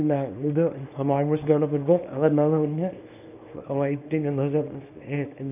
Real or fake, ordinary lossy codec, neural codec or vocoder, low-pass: fake; none; codec, 24 kHz, 0.9 kbps, WavTokenizer, small release; 3.6 kHz